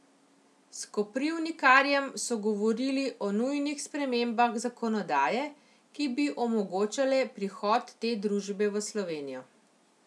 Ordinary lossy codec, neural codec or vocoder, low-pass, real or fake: none; none; none; real